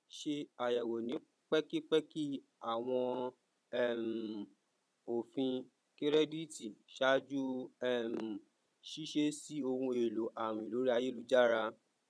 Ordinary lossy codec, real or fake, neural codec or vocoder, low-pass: none; fake; vocoder, 22.05 kHz, 80 mel bands, Vocos; none